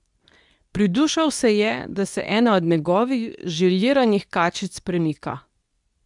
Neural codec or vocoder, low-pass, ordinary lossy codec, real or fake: codec, 24 kHz, 0.9 kbps, WavTokenizer, medium speech release version 2; 10.8 kHz; none; fake